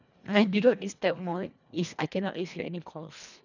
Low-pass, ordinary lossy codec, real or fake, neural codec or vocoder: 7.2 kHz; none; fake; codec, 24 kHz, 1.5 kbps, HILCodec